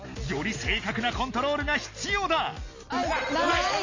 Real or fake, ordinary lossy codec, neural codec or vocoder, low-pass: real; MP3, 32 kbps; none; 7.2 kHz